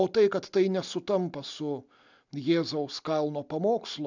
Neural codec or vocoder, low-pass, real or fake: none; 7.2 kHz; real